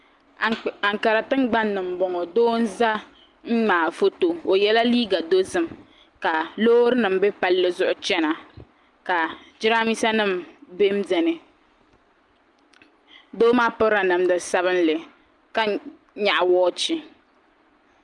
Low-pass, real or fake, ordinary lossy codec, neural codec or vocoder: 10.8 kHz; real; Opus, 32 kbps; none